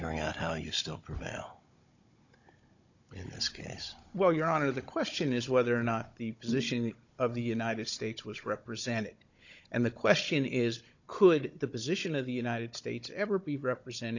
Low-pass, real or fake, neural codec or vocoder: 7.2 kHz; fake; codec, 16 kHz, 16 kbps, FunCodec, trained on Chinese and English, 50 frames a second